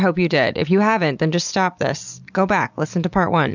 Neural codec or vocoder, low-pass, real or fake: none; 7.2 kHz; real